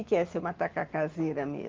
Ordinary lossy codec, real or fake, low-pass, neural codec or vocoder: Opus, 32 kbps; real; 7.2 kHz; none